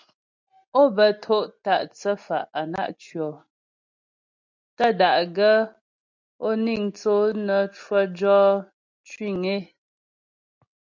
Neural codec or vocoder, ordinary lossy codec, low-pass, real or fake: none; MP3, 64 kbps; 7.2 kHz; real